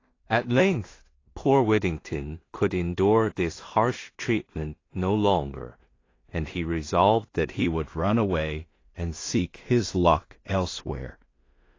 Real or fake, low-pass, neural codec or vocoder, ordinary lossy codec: fake; 7.2 kHz; codec, 16 kHz in and 24 kHz out, 0.4 kbps, LongCat-Audio-Codec, two codebook decoder; AAC, 32 kbps